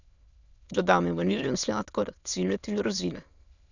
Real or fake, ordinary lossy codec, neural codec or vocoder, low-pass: fake; none; autoencoder, 22.05 kHz, a latent of 192 numbers a frame, VITS, trained on many speakers; 7.2 kHz